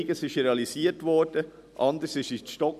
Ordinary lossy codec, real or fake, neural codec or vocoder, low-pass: none; real; none; 14.4 kHz